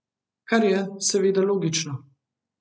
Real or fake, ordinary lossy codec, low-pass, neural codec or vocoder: real; none; none; none